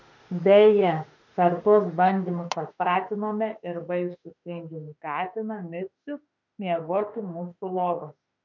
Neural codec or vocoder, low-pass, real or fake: autoencoder, 48 kHz, 32 numbers a frame, DAC-VAE, trained on Japanese speech; 7.2 kHz; fake